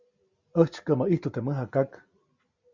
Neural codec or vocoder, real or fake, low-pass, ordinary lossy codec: none; real; 7.2 kHz; Opus, 64 kbps